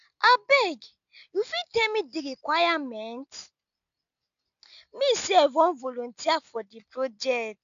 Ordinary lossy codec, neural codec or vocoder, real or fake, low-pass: none; none; real; 7.2 kHz